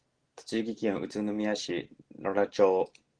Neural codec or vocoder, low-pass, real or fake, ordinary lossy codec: none; 9.9 kHz; real; Opus, 16 kbps